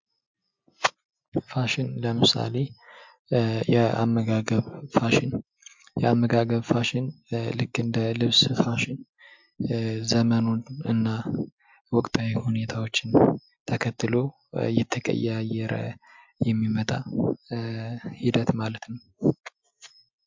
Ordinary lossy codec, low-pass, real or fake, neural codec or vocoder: MP3, 64 kbps; 7.2 kHz; real; none